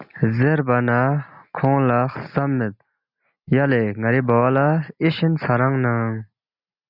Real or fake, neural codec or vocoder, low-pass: real; none; 5.4 kHz